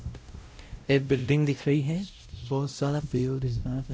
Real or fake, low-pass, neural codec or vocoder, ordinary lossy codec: fake; none; codec, 16 kHz, 0.5 kbps, X-Codec, WavLM features, trained on Multilingual LibriSpeech; none